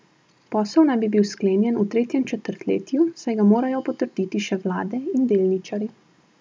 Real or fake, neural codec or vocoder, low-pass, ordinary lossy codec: real; none; none; none